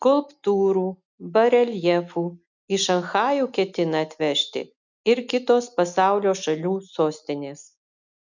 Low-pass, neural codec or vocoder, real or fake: 7.2 kHz; none; real